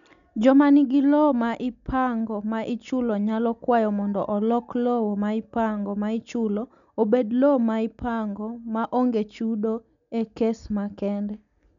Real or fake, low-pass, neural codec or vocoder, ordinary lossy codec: real; 7.2 kHz; none; none